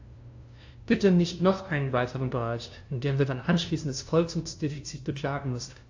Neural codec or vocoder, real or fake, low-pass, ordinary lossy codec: codec, 16 kHz, 0.5 kbps, FunCodec, trained on LibriTTS, 25 frames a second; fake; 7.2 kHz; AAC, 48 kbps